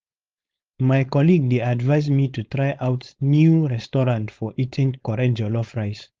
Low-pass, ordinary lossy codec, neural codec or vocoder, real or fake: 7.2 kHz; Opus, 32 kbps; codec, 16 kHz, 4.8 kbps, FACodec; fake